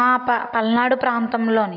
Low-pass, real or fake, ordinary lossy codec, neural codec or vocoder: 5.4 kHz; real; none; none